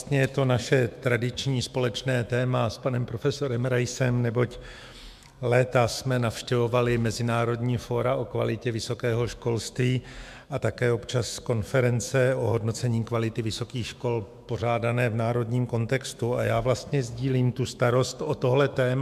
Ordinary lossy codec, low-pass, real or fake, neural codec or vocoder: MP3, 96 kbps; 14.4 kHz; fake; autoencoder, 48 kHz, 128 numbers a frame, DAC-VAE, trained on Japanese speech